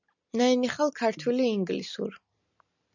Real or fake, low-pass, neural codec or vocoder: real; 7.2 kHz; none